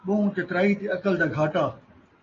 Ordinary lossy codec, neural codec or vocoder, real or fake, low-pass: AAC, 32 kbps; none; real; 7.2 kHz